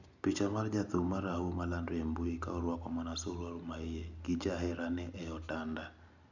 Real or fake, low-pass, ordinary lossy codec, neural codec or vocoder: real; 7.2 kHz; none; none